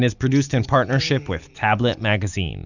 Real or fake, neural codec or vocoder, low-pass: real; none; 7.2 kHz